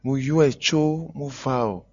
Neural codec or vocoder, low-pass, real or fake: none; 7.2 kHz; real